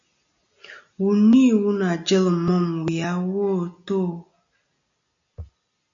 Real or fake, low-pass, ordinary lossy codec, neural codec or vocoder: real; 7.2 kHz; MP3, 64 kbps; none